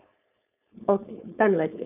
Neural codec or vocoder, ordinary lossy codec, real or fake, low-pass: codec, 16 kHz, 4.8 kbps, FACodec; none; fake; 3.6 kHz